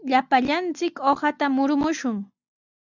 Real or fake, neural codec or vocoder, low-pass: real; none; 7.2 kHz